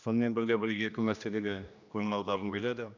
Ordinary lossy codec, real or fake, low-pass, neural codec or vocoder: none; fake; 7.2 kHz; codec, 16 kHz, 1 kbps, X-Codec, HuBERT features, trained on general audio